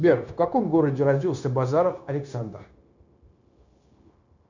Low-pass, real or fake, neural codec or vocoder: 7.2 kHz; fake; codec, 16 kHz, 0.9 kbps, LongCat-Audio-Codec